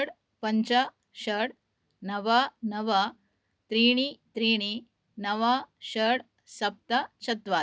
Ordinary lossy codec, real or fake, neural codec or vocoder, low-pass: none; real; none; none